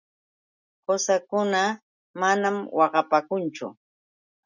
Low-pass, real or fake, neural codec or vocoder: 7.2 kHz; real; none